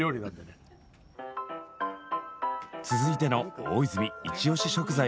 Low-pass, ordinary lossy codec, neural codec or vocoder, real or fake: none; none; none; real